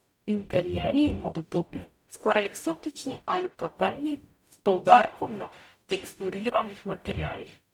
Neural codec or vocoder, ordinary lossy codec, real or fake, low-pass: codec, 44.1 kHz, 0.9 kbps, DAC; none; fake; 19.8 kHz